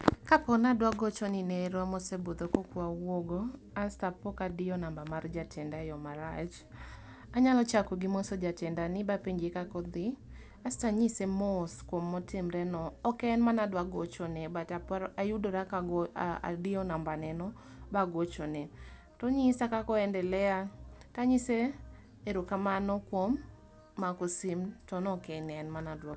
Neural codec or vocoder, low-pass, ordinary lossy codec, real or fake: none; none; none; real